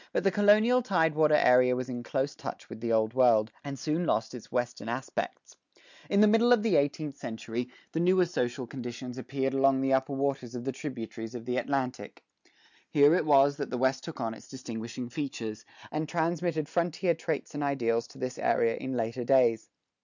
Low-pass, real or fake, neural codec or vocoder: 7.2 kHz; real; none